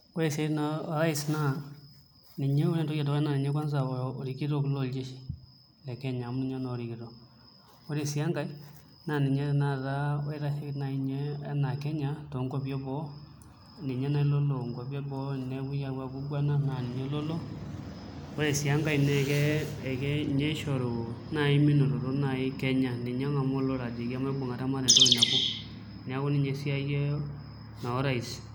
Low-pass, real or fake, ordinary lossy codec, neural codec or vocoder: none; real; none; none